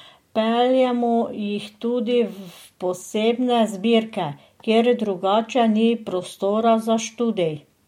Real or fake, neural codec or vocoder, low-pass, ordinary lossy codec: real; none; 19.8 kHz; MP3, 64 kbps